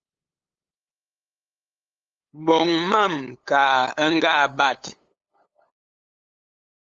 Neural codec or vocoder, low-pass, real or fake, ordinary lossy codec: codec, 16 kHz, 8 kbps, FunCodec, trained on LibriTTS, 25 frames a second; 7.2 kHz; fake; Opus, 32 kbps